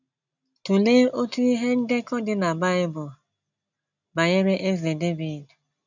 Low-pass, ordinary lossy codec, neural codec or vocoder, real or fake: 7.2 kHz; none; none; real